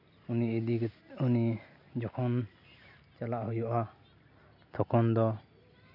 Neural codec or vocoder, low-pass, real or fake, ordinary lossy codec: none; 5.4 kHz; real; none